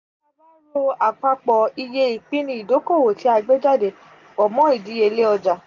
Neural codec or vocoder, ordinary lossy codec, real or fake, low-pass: none; none; real; 7.2 kHz